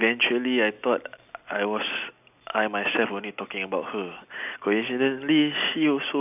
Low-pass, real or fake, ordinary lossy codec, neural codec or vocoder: 3.6 kHz; real; none; none